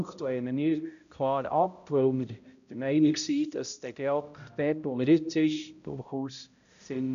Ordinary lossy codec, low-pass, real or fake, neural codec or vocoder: none; 7.2 kHz; fake; codec, 16 kHz, 0.5 kbps, X-Codec, HuBERT features, trained on balanced general audio